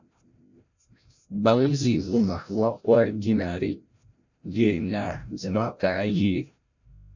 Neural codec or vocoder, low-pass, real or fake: codec, 16 kHz, 0.5 kbps, FreqCodec, larger model; 7.2 kHz; fake